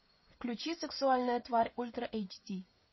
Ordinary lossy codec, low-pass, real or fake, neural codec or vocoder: MP3, 24 kbps; 7.2 kHz; fake; codec, 16 kHz, 16 kbps, FreqCodec, smaller model